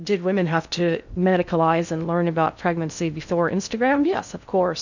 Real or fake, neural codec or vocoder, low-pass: fake; codec, 16 kHz in and 24 kHz out, 0.6 kbps, FocalCodec, streaming, 2048 codes; 7.2 kHz